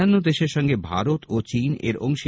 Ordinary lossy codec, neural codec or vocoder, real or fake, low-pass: none; none; real; none